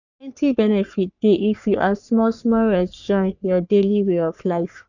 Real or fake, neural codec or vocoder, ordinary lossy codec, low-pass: fake; codec, 44.1 kHz, 3.4 kbps, Pupu-Codec; none; 7.2 kHz